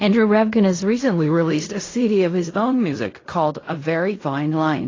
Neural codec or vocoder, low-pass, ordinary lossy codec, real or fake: codec, 16 kHz in and 24 kHz out, 0.4 kbps, LongCat-Audio-Codec, fine tuned four codebook decoder; 7.2 kHz; AAC, 32 kbps; fake